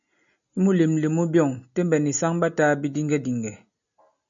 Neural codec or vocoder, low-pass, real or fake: none; 7.2 kHz; real